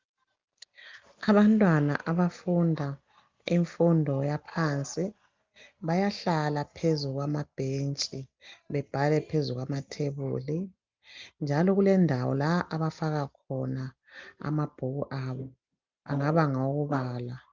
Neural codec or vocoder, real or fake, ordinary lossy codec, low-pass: none; real; Opus, 24 kbps; 7.2 kHz